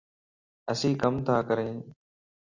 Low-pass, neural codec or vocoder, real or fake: 7.2 kHz; none; real